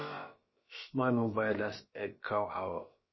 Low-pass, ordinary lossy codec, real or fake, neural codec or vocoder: 7.2 kHz; MP3, 24 kbps; fake; codec, 16 kHz, about 1 kbps, DyCAST, with the encoder's durations